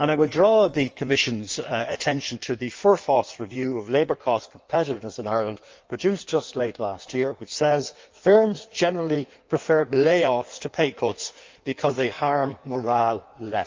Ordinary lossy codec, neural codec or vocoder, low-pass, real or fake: Opus, 24 kbps; codec, 16 kHz in and 24 kHz out, 1.1 kbps, FireRedTTS-2 codec; 7.2 kHz; fake